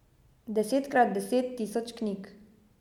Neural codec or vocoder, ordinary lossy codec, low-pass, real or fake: none; MP3, 96 kbps; 19.8 kHz; real